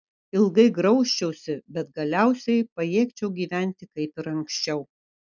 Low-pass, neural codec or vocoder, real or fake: 7.2 kHz; none; real